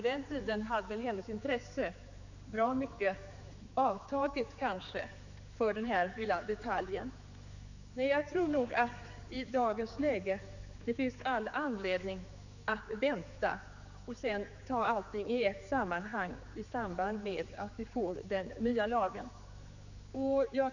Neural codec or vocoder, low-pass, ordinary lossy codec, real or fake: codec, 16 kHz, 4 kbps, X-Codec, HuBERT features, trained on general audio; 7.2 kHz; none; fake